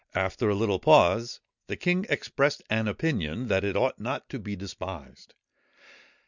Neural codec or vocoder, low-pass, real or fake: none; 7.2 kHz; real